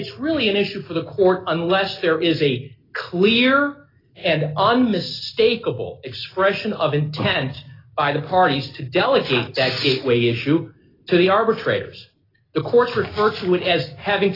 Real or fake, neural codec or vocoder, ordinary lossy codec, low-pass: real; none; AAC, 24 kbps; 5.4 kHz